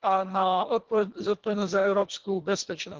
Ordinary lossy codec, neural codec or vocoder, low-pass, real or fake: Opus, 16 kbps; codec, 24 kHz, 1.5 kbps, HILCodec; 7.2 kHz; fake